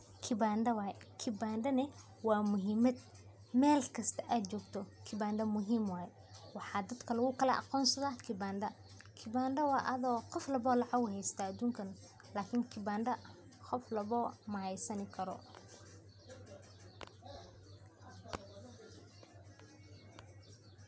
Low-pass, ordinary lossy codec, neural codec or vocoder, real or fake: none; none; none; real